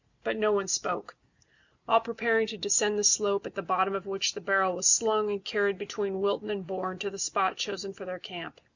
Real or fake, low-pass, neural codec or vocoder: real; 7.2 kHz; none